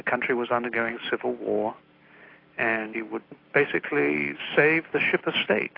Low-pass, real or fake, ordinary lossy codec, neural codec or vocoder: 5.4 kHz; real; AAC, 32 kbps; none